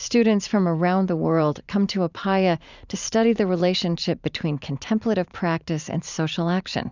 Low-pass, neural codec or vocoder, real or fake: 7.2 kHz; vocoder, 44.1 kHz, 128 mel bands every 512 samples, BigVGAN v2; fake